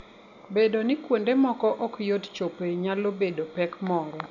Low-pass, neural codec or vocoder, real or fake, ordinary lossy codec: 7.2 kHz; none; real; none